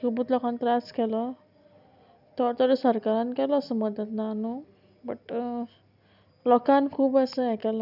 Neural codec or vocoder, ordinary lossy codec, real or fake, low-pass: none; none; real; 5.4 kHz